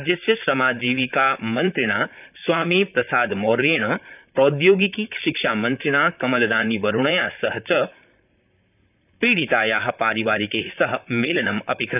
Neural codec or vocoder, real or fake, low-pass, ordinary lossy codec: vocoder, 44.1 kHz, 128 mel bands, Pupu-Vocoder; fake; 3.6 kHz; none